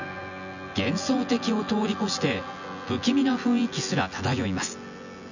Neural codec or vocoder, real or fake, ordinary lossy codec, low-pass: vocoder, 24 kHz, 100 mel bands, Vocos; fake; none; 7.2 kHz